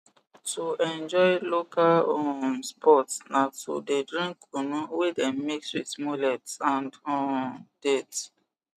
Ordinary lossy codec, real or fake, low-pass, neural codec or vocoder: none; real; 14.4 kHz; none